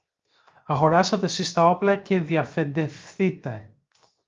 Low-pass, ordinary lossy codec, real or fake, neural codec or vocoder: 7.2 kHz; Opus, 32 kbps; fake; codec, 16 kHz, 0.7 kbps, FocalCodec